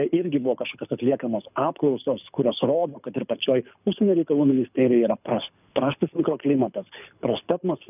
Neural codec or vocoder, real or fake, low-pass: codec, 24 kHz, 6 kbps, HILCodec; fake; 3.6 kHz